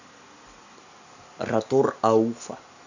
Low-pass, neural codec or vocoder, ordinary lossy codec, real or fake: 7.2 kHz; none; none; real